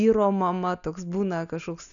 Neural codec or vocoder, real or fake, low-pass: none; real; 7.2 kHz